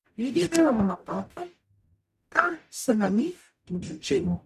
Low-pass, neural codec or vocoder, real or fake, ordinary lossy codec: 14.4 kHz; codec, 44.1 kHz, 0.9 kbps, DAC; fake; none